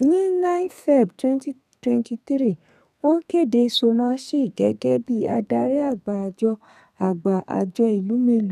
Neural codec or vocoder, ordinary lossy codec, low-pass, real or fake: codec, 32 kHz, 1.9 kbps, SNAC; none; 14.4 kHz; fake